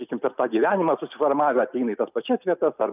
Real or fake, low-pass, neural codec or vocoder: real; 3.6 kHz; none